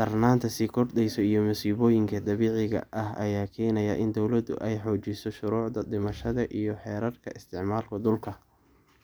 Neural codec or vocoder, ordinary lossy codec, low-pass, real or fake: none; none; none; real